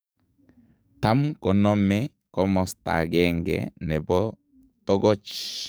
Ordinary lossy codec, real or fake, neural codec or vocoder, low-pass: none; fake; codec, 44.1 kHz, 7.8 kbps, DAC; none